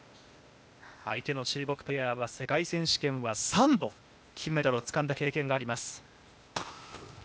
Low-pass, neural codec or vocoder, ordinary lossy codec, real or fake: none; codec, 16 kHz, 0.8 kbps, ZipCodec; none; fake